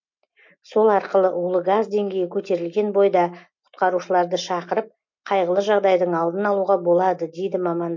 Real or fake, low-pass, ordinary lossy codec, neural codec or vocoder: real; 7.2 kHz; MP3, 48 kbps; none